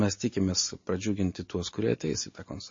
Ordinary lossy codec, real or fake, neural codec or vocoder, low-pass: MP3, 32 kbps; real; none; 7.2 kHz